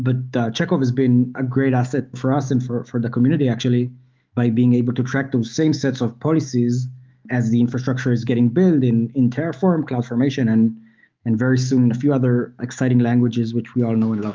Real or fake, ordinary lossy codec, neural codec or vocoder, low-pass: fake; Opus, 32 kbps; autoencoder, 48 kHz, 128 numbers a frame, DAC-VAE, trained on Japanese speech; 7.2 kHz